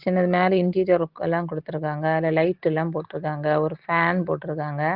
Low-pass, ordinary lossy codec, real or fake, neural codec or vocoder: 5.4 kHz; Opus, 16 kbps; real; none